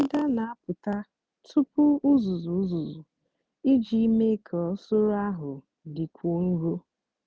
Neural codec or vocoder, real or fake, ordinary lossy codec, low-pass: none; real; none; none